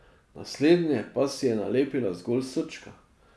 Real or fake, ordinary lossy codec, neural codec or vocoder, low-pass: real; none; none; none